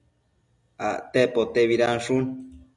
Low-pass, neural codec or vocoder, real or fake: 10.8 kHz; none; real